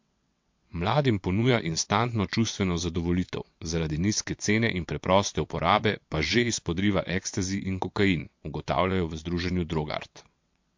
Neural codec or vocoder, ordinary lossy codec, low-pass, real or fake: vocoder, 22.05 kHz, 80 mel bands, WaveNeXt; MP3, 48 kbps; 7.2 kHz; fake